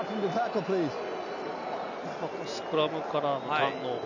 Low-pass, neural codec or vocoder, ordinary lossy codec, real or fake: 7.2 kHz; none; none; real